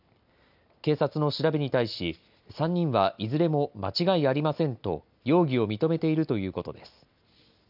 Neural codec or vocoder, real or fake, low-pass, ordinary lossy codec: none; real; 5.4 kHz; none